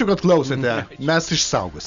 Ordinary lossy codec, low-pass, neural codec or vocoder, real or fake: MP3, 96 kbps; 7.2 kHz; none; real